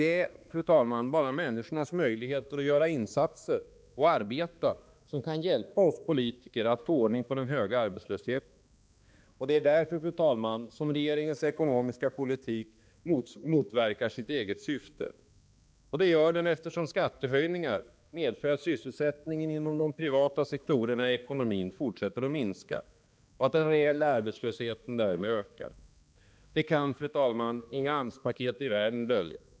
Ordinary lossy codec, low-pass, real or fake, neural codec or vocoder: none; none; fake; codec, 16 kHz, 2 kbps, X-Codec, HuBERT features, trained on balanced general audio